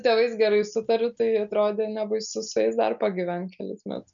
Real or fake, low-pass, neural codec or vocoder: real; 7.2 kHz; none